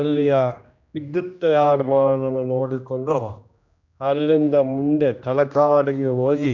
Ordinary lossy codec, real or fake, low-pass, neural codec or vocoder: none; fake; 7.2 kHz; codec, 16 kHz, 1 kbps, X-Codec, HuBERT features, trained on general audio